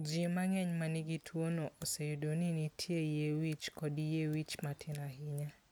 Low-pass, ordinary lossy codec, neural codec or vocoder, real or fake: none; none; none; real